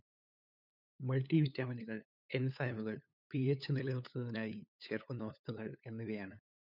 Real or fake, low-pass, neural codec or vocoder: fake; 5.4 kHz; codec, 16 kHz, 8 kbps, FunCodec, trained on LibriTTS, 25 frames a second